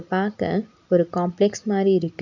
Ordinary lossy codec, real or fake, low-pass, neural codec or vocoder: none; real; 7.2 kHz; none